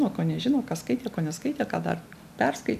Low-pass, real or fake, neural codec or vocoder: 14.4 kHz; real; none